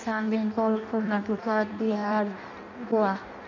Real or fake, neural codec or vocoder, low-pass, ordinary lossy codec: fake; codec, 16 kHz in and 24 kHz out, 0.6 kbps, FireRedTTS-2 codec; 7.2 kHz; none